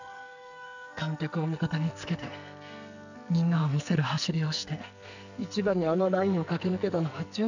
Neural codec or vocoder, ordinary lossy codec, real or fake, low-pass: codec, 44.1 kHz, 2.6 kbps, SNAC; none; fake; 7.2 kHz